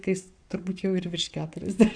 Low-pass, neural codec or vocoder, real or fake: 9.9 kHz; codec, 44.1 kHz, 7.8 kbps, Pupu-Codec; fake